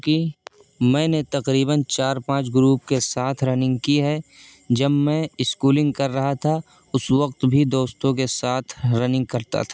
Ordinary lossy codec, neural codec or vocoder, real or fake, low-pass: none; none; real; none